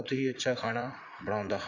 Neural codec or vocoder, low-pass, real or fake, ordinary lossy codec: vocoder, 44.1 kHz, 80 mel bands, Vocos; 7.2 kHz; fake; AAC, 48 kbps